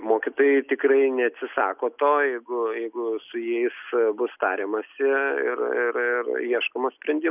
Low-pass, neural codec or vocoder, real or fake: 3.6 kHz; none; real